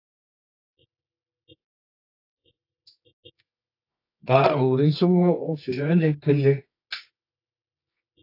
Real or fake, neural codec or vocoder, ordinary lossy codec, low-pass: fake; codec, 24 kHz, 0.9 kbps, WavTokenizer, medium music audio release; AAC, 32 kbps; 5.4 kHz